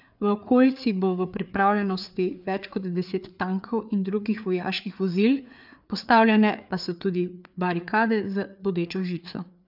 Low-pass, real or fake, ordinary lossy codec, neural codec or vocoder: 5.4 kHz; fake; none; codec, 16 kHz, 4 kbps, FreqCodec, larger model